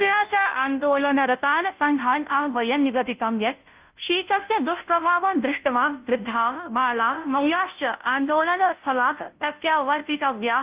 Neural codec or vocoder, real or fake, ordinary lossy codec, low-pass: codec, 16 kHz, 0.5 kbps, FunCodec, trained on Chinese and English, 25 frames a second; fake; Opus, 32 kbps; 3.6 kHz